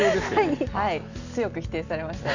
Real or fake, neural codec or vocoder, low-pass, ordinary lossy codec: real; none; 7.2 kHz; none